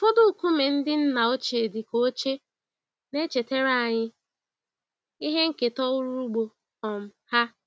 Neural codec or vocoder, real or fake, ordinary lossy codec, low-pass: none; real; none; none